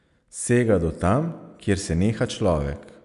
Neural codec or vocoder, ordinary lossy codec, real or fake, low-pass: none; MP3, 96 kbps; real; 10.8 kHz